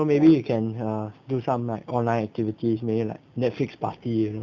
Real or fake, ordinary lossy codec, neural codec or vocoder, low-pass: real; none; none; 7.2 kHz